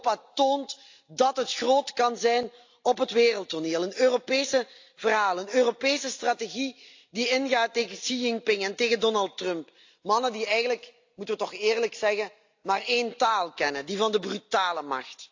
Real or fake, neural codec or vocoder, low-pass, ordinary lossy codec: real; none; 7.2 kHz; AAC, 48 kbps